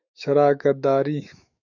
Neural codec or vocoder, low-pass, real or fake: autoencoder, 48 kHz, 128 numbers a frame, DAC-VAE, trained on Japanese speech; 7.2 kHz; fake